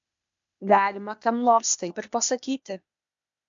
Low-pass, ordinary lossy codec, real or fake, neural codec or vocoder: 7.2 kHz; MP3, 64 kbps; fake; codec, 16 kHz, 0.8 kbps, ZipCodec